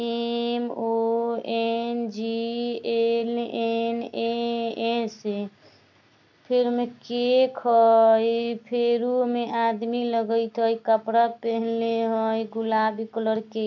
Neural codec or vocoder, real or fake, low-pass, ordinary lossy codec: none; real; 7.2 kHz; none